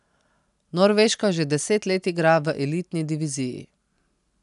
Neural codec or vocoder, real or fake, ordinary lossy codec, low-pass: none; real; none; 10.8 kHz